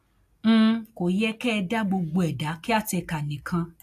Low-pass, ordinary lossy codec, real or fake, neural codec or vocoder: 14.4 kHz; AAC, 64 kbps; real; none